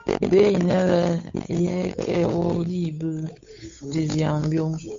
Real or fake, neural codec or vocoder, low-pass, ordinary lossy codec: fake; codec, 16 kHz, 8 kbps, FunCodec, trained on Chinese and English, 25 frames a second; 7.2 kHz; MP3, 48 kbps